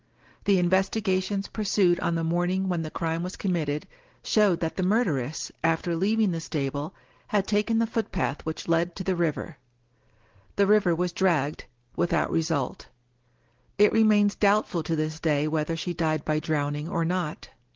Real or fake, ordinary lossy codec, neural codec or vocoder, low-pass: real; Opus, 16 kbps; none; 7.2 kHz